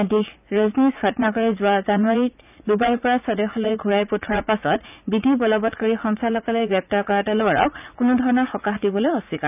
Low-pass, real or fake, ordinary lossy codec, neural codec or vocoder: 3.6 kHz; fake; none; vocoder, 44.1 kHz, 80 mel bands, Vocos